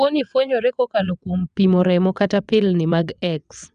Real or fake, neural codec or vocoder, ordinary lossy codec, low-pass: fake; vocoder, 22.05 kHz, 80 mel bands, WaveNeXt; none; 9.9 kHz